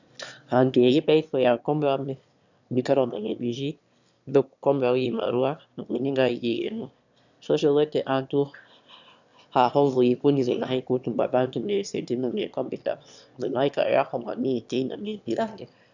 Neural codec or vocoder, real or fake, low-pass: autoencoder, 22.05 kHz, a latent of 192 numbers a frame, VITS, trained on one speaker; fake; 7.2 kHz